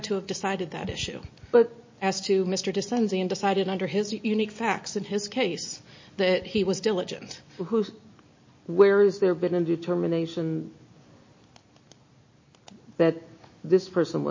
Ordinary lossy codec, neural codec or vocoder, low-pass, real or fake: MP3, 32 kbps; none; 7.2 kHz; real